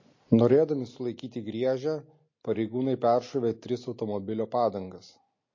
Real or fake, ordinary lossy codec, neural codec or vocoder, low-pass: real; MP3, 32 kbps; none; 7.2 kHz